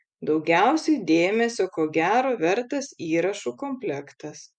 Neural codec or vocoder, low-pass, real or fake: none; 9.9 kHz; real